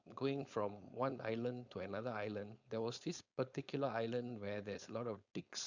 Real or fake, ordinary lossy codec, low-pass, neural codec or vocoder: fake; Opus, 64 kbps; 7.2 kHz; codec, 16 kHz, 4.8 kbps, FACodec